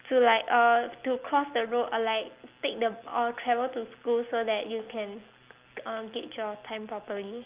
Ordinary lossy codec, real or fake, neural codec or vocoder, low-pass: Opus, 32 kbps; real; none; 3.6 kHz